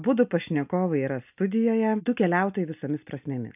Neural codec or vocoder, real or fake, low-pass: none; real; 3.6 kHz